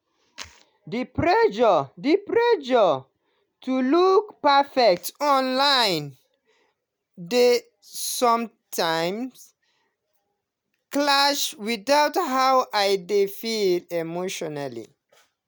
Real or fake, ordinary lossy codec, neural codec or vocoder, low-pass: real; none; none; none